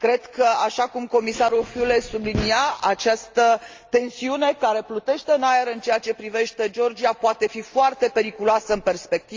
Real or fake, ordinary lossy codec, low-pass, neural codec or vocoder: real; Opus, 32 kbps; 7.2 kHz; none